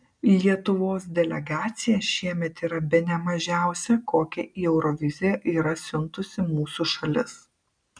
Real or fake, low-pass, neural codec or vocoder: real; 9.9 kHz; none